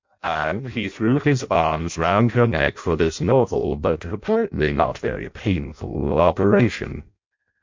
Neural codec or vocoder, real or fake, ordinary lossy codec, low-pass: codec, 16 kHz in and 24 kHz out, 0.6 kbps, FireRedTTS-2 codec; fake; MP3, 64 kbps; 7.2 kHz